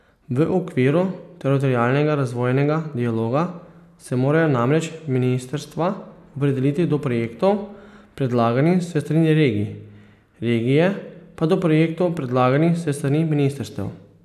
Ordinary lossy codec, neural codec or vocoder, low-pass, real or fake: none; none; 14.4 kHz; real